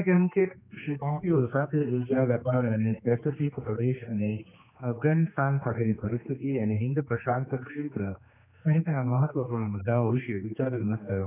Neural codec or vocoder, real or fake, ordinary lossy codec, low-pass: codec, 16 kHz, 2 kbps, X-Codec, HuBERT features, trained on general audio; fake; none; 3.6 kHz